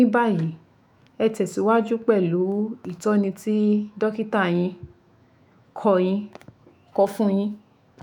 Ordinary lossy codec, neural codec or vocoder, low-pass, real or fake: none; autoencoder, 48 kHz, 128 numbers a frame, DAC-VAE, trained on Japanese speech; 19.8 kHz; fake